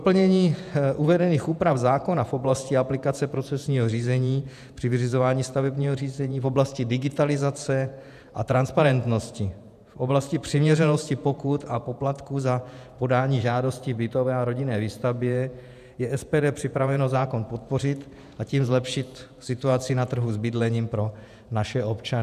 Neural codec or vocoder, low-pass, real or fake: vocoder, 48 kHz, 128 mel bands, Vocos; 14.4 kHz; fake